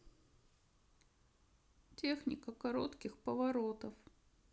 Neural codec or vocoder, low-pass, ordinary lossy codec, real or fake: none; none; none; real